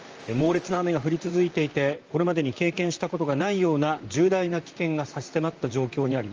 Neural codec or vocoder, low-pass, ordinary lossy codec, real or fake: vocoder, 44.1 kHz, 128 mel bands, Pupu-Vocoder; 7.2 kHz; Opus, 24 kbps; fake